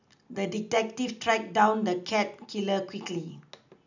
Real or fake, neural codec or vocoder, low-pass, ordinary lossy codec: real; none; 7.2 kHz; none